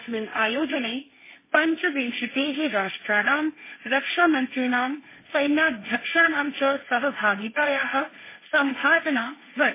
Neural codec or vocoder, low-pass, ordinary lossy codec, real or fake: codec, 16 kHz, 1.1 kbps, Voila-Tokenizer; 3.6 kHz; MP3, 16 kbps; fake